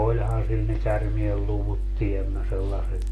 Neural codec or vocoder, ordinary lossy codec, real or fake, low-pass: none; AAC, 48 kbps; real; 14.4 kHz